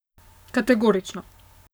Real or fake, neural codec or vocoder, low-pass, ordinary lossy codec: fake; codec, 44.1 kHz, 7.8 kbps, Pupu-Codec; none; none